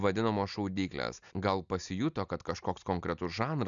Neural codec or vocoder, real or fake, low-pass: none; real; 7.2 kHz